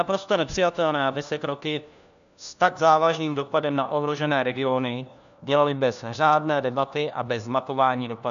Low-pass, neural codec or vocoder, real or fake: 7.2 kHz; codec, 16 kHz, 1 kbps, FunCodec, trained on LibriTTS, 50 frames a second; fake